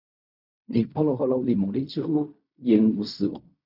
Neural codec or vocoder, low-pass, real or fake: codec, 16 kHz in and 24 kHz out, 0.4 kbps, LongCat-Audio-Codec, fine tuned four codebook decoder; 5.4 kHz; fake